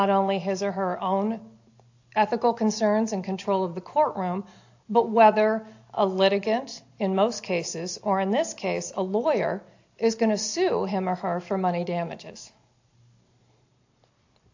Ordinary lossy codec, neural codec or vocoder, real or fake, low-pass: AAC, 48 kbps; none; real; 7.2 kHz